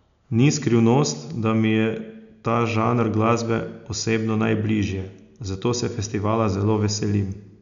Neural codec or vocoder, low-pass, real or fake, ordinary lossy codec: none; 7.2 kHz; real; none